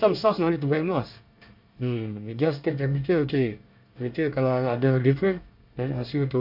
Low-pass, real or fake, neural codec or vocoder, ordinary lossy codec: 5.4 kHz; fake; codec, 24 kHz, 1 kbps, SNAC; MP3, 48 kbps